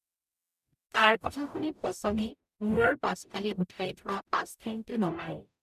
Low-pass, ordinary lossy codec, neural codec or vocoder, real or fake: 14.4 kHz; none; codec, 44.1 kHz, 0.9 kbps, DAC; fake